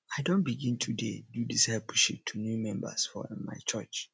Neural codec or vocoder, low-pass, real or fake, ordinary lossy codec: none; none; real; none